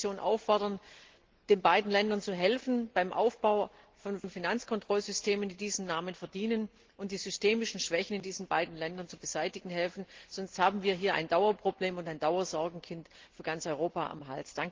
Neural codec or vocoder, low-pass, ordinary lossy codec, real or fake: none; 7.2 kHz; Opus, 16 kbps; real